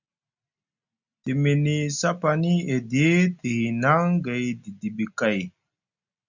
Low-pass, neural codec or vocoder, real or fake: 7.2 kHz; none; real